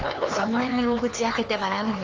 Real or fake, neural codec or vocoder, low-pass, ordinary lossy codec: fake; codec, 16 kHz, 4 kbps, X-Codec, HuBERT features, trained on LibriSpeech; 7.2 kHz; Opus, 24 kbps